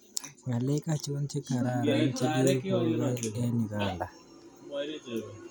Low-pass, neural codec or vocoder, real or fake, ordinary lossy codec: none; none; real; none